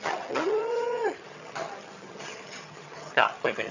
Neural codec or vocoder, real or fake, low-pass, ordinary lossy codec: vocoder, 22.05 kHz, 80 mel bands, HiFi-GAN; fake; 7.2 kHz; none